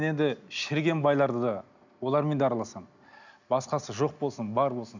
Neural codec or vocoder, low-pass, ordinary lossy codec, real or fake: none; 7.2 kHz; none; real